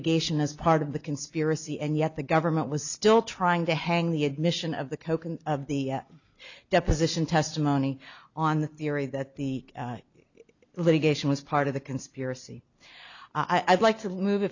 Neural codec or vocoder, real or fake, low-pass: none; real; 7.2 kHz